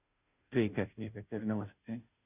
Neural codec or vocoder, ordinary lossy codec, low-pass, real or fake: codec, 16 kHz, 0.5 kbps, FunCodec, trained on Chinese and English, 25 frames a second; none; 3.6 kHz; fake